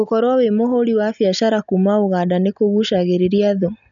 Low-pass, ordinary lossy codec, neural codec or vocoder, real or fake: 7.2 kHz; none; none; real